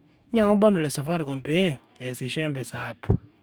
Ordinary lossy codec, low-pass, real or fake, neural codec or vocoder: none; none; fake; codec, 44.1 kHz, 2.6 kbps, DAC